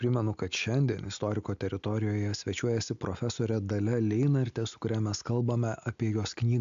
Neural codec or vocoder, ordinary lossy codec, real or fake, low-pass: none; MP3, 64 kbps; real; 7.2 kHz